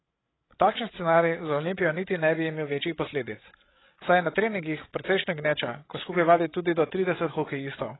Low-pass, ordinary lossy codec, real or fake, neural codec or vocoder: 7.2 kHz; AAC, 16 kbps; fake; codec, 16 kHz, 16 kbps, FreqCodec, larger model